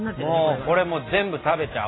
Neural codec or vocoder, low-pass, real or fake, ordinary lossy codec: none; 7.2 kHz; real; AAC, 16 kbps